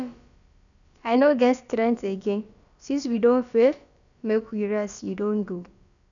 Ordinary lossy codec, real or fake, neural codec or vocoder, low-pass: none; fake; codec, 16 kHz, about 1 kbps, DyCAST, with the encoder's durations; 7.2 kHz